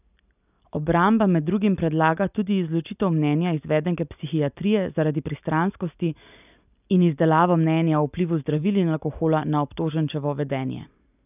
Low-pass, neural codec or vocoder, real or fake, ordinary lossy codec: 3.6 kHz; none; real; none